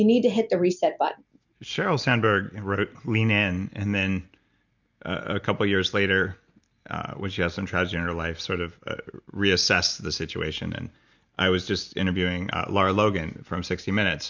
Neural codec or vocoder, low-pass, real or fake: none; 7.2 kHz; real